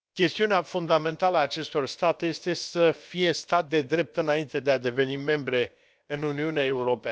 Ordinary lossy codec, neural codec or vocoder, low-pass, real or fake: none; codec, 16 kHz, about 1 kbps, DyCAST, with the encoder's durations; none; fake